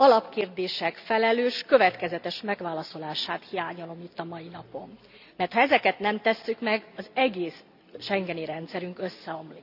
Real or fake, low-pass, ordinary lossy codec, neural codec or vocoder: real; 5.4 kHz; none; none